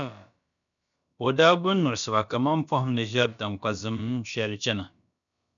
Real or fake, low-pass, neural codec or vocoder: fake; 7.2 kHz; codec, 16 kHz, about 1 kbps, DyCAST, with the encoder's durations